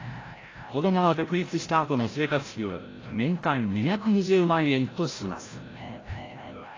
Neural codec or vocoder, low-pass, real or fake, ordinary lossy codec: codec, 16 kHz, 0.5 kbps, FreqCodec, larger model; 7.2 kHz; fake; AAC, 32 kbps